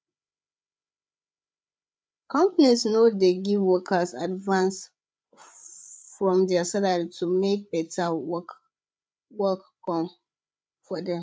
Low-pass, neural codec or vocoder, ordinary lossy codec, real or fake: none; codec, 16 kHz, 8 kbps, FreqCodec, larger model; none; fake